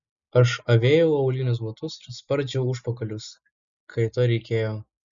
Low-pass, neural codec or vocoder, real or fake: 7.2 kHz; none; real